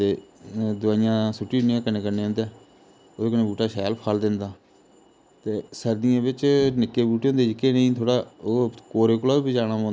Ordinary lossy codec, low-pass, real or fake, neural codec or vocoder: none; none; real; none